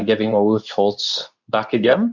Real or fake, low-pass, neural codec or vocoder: fake; 7.2 kHz; codec, 24 kHz, 0.9 kbps, WavTokenizer, medium speech release version 2